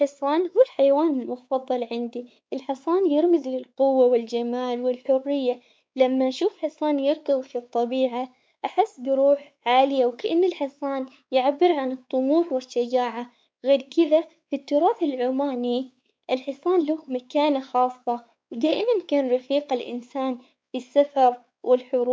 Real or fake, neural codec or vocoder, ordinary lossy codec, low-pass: fake; codec, 16 kHz, 4 kbps, X-Codec, WavLM features, trained on Multilingual LibriSpeech; none; none